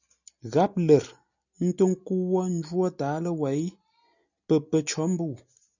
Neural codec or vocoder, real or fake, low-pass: none; real; 7.2 kHz